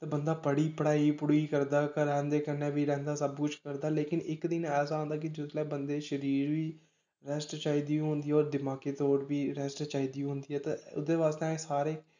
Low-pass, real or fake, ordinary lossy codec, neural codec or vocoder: 7.2 kHz; real; none; none